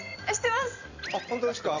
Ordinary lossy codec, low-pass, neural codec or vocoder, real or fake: none; 7.2 kHz; vocoder, 44.1 kHz, 128 mel bands every 256 samples, BigVGAN v2; fake